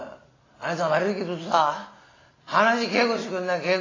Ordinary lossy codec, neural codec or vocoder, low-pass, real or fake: AAC, 32 kbps; none; 7.2 kHz; real